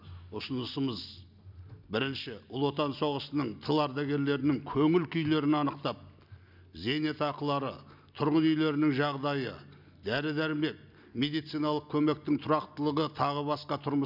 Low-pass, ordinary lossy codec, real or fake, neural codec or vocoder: 5.4 kHz; none; real; none